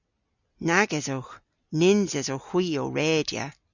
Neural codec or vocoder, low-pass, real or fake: none; 7.2 kHz; real